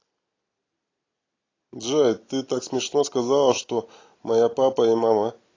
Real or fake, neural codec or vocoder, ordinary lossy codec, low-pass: real; none; AAC, 32 kbps; 7.2 kHz